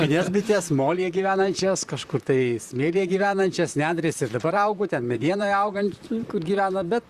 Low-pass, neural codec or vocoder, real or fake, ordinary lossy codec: 14.4 kHz; vocoder, 44.1 kHz, 128 mel bands, Pupu-Vocoder; fake; Opus, 64 kbps